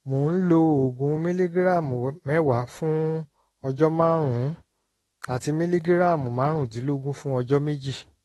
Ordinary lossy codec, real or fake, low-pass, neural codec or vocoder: AAC, 32 kbps; fake; 19.8 kHz; autoencoder, 48 kHz, 32 numbers a frame, DAC-VAE, trained on Japanese speech